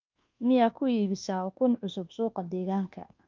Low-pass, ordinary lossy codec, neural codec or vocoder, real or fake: 7.2 kHz; Opus, 24 kbps; codec, 24 kHz, 1.2 kbps, DualCodec; fake